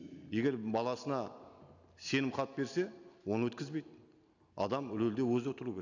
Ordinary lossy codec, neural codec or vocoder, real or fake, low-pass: none; none; real; 7.2 kHz